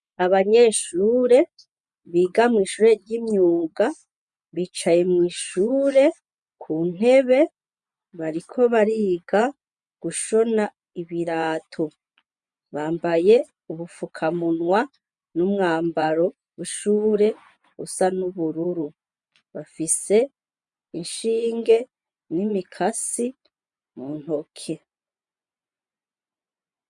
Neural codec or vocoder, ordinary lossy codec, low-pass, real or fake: vocoder, 24 kHz, 100 mel bands, Vocos; MP3, 96 kbps; 10.8 kHz; fake